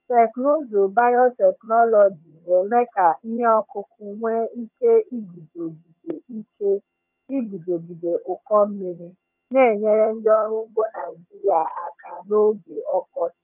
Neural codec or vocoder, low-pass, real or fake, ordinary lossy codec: vocoder, 22.05 kHz, 80 mel bands, HiFi-GAN; 3.6 kHz; fake; none